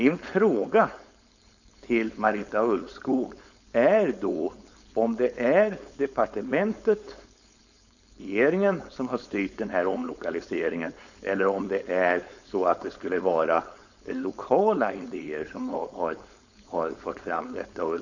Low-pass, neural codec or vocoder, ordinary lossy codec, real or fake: 7.2 kHz; codec, 16 kHz, 4.8 kbps, FACodec; none; fake